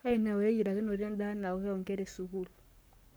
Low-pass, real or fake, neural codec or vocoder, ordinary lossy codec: none; fake; codec, 44.1 kHz, 7.8 kbps, Pupu-Codec; none